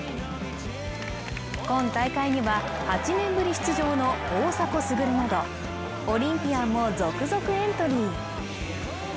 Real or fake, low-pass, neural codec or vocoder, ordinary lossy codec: real; none; none; none